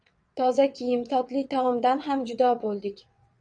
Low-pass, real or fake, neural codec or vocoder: 9.9 kHz; fake; vocoder, 22.05 kHz, 80 mel bands, WaveNeXt